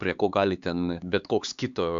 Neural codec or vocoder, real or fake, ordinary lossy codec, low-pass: codec, 16 kHz, 4 kbps, X-Codec, HuBERT features, trained on LibriSpeech; fake; Opus, 64 kbps; 7.2 kHz